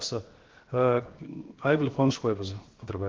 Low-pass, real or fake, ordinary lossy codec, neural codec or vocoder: 7.2 kHz; fake; Opus, 16 kbps; codec, 16 kHz, 0.7 kbps, FocalCodec